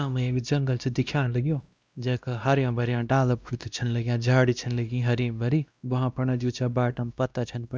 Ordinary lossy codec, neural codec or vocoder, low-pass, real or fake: none; codec, 16 kHz, 1 kbps, X-Codec, WavLM features, trained on Multilingual LibriSpeech; none; fake